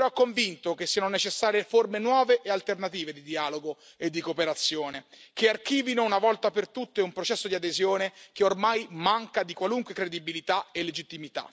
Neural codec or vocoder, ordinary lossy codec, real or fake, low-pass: none; none; real; none